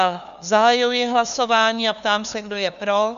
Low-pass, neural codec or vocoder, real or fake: 7.2 kHz; codec, 16 kHz, 2 kbps, FunCodec, trained on LibriTTS, 25 frames a second; fake